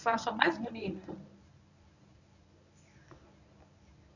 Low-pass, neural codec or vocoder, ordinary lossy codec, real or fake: 7.2 kHz; codec, 24 kHz, 0.9 kbps, WavTokenizer, medium speech release version 1; none; fake